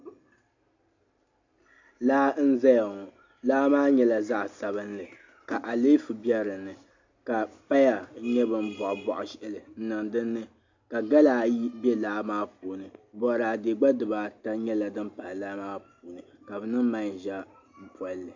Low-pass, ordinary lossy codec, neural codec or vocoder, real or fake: 7.2 kHz; AAC, 48 kbps; none; real